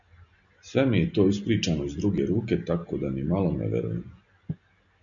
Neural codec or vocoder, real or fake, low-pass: none; real; 7.2 kHz